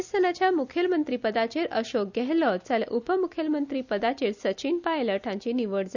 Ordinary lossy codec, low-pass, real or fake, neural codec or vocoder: none; 7.2 kHz; real; none